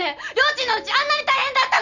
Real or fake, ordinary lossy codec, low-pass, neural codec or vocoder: real; none; 7.2 kHz; none